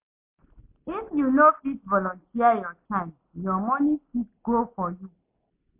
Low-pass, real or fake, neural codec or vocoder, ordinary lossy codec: 3.6 kHz; real; none; MP3, 32 kbps